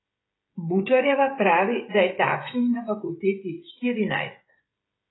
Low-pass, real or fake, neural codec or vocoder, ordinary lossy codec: 7.2 kHz; fake; codec, 16 kHz, 16 kbps, FreqCodec, smaller model; AAC, 16 kbps